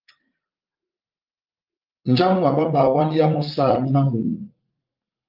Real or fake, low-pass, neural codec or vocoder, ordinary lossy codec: fake; 5.4 kHz; vocoder, 44.1 kHz, 80 mel bands, Vocos; Opus, 32 kbps